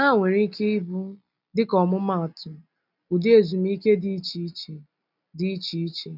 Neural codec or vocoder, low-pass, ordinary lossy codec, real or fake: none; 5.4 kHz; none; real